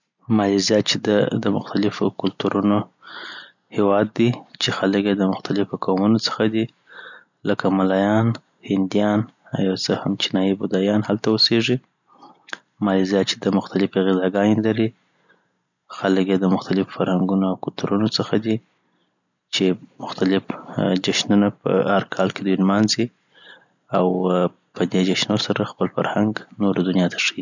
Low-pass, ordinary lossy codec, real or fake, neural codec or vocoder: 7.2 kHz; none; real; none